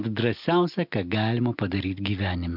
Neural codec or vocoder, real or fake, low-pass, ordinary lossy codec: none; real; 5.4 kHz; MP3, 48 kbps